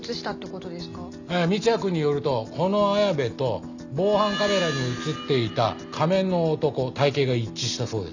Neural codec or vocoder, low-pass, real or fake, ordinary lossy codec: none; 7.2 kHz; real; none